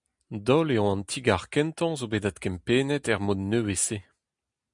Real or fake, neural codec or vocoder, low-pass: real; none; 10.8 kHz